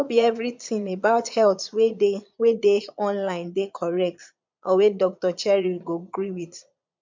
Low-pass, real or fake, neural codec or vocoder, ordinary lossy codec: 7.2 kHz; fake; vocoder, 22.05 kHz, 80 mel bands, Vocos; MP3, 64 kbps